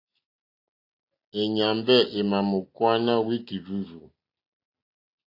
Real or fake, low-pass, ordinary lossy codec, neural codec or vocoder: real; 5.4 kHz; AAC, 32 kbps; none